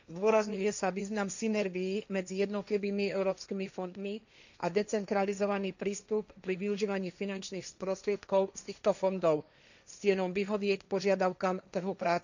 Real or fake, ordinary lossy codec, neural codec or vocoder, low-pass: fake; none; codec, 16 kHz, 1.1 kbps, Voila-Tokenizer; 7.2 kHz